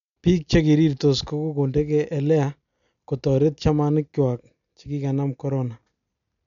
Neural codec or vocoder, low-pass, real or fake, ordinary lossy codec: none; 7.2 kHz; real; none